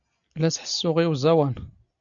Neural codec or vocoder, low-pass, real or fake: none; 7.2 kHz; real